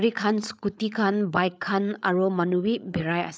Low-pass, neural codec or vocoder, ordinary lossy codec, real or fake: none; codec, 16 kHz, 8 kbps, FreqCodec, larger model; none; fake